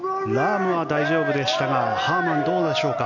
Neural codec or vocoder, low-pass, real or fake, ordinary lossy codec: none; 7.2 kHz; real; none